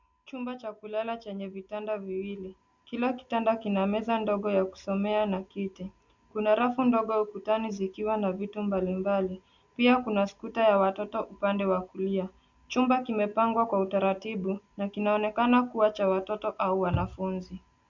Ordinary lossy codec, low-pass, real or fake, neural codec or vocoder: Opus, 64 kbps; 7.2 kHz; real; none